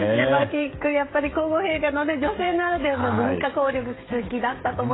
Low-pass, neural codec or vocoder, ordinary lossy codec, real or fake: 7.2 kHz; codec, 16 kHz, 16 kbps, FreqCodec, smaller model; AAC, 16 kbps; fake